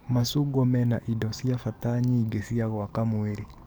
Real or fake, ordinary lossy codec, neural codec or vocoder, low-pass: fake; none; codec, 44.1 kHz, 7.8 kbps, DAC; none